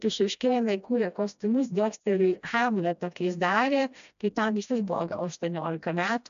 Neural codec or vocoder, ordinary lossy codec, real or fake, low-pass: codec, 16 kHz, 1 kbps, FreqCodec, smaller model; AAC, 96 kbps; fake; 7.2 kHz